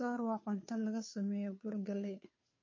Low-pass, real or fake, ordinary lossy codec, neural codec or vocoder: 7.2 kHz; fake; MP3, 32 kbps; codec, 16 kHz, 2 kbps, FunCodec, trained on Chinese and English, 25 frames a second